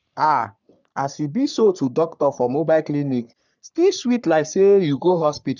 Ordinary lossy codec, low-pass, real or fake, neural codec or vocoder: none; 7.2 kHz; fake; codec, 44.1 kHz, 3.4 kbps, Pupu-Codec